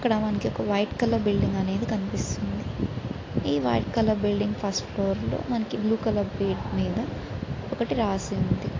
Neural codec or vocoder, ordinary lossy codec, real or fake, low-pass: none; AAC, 32 kbps; real; 7.2 kHz